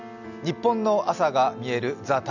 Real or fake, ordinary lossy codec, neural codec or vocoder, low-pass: real; none; none; 7.2 kHz